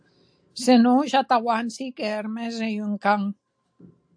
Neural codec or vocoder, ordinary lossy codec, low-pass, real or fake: none; AAC, 64 kbps; 9.9 kHz; real